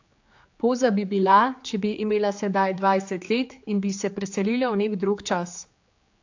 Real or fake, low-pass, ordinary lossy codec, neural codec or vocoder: fake; 7.2 kHz; AAC, 48 kbps; codec, 16 kHz, 4 kbps, X-Codec, HuBERT features, trained on general audio